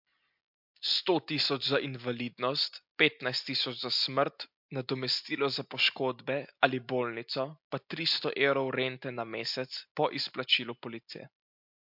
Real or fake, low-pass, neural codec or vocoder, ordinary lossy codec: real; 5.4 kHz; none; MP3, 48 kbps